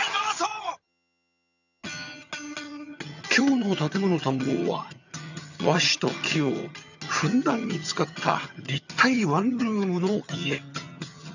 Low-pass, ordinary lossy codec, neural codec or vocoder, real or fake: 7.2 kHz; none; vocoder, 22.05 kHz, 80 mel bands, HiFi-GAN; fake